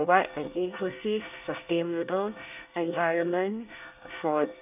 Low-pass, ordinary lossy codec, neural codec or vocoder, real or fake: 3.6 kHz; none; codec, 24 kHz, 1 kbps, SNAC; fake